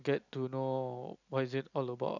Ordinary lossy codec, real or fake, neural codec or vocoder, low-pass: none; real; none; 7.2 kHz